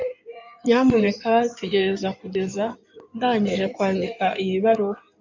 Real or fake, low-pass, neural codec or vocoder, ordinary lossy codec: fake; 7.2 kHz; codec, 16 kHz in and 24 kHz out, 2.2 kbps, FireRedTTS-2 codec; MP3, 64 kbps